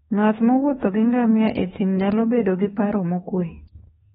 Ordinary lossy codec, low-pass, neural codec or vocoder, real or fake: AAC, 16 kbps; 19.8 kHz; autoencoder, 48 kHz, 32 numbers a frame, DAC-VAE, trained on Japanese speech; fake